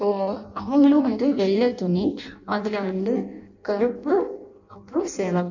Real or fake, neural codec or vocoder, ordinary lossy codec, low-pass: fake; codec, 16 kHz in and 24 kHz out, 0.6 kbps, FireRedTTS-2 codec; none; 7.2 kHz